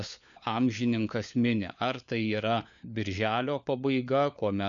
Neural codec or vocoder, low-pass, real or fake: codec, 16 kHz, 4 kbps, FunCodec, trained on LibriTTS, 50 frames a second; 7.2 kHz; fake